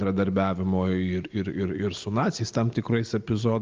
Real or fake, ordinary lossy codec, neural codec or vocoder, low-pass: real; Opus, 24 kbps; none; 7.2 kHz